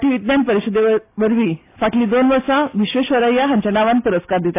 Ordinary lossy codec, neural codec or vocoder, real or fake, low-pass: AAC, 24 kbps; none; real; 3.6 kHz